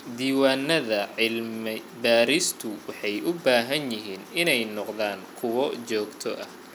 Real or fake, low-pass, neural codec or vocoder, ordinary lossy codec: real; 19.8 kHz; none; none